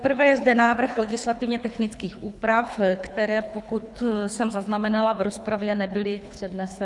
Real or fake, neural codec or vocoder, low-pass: fake; codec, 24 kHz, 3 kbps, HILCodec; 10.8 kHz